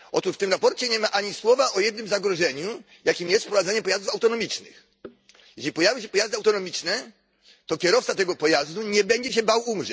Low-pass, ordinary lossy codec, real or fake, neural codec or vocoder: none; none; real; none